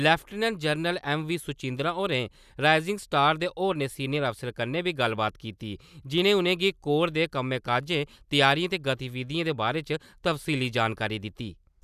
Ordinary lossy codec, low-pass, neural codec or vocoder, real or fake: none; 14.4 kHz; none; real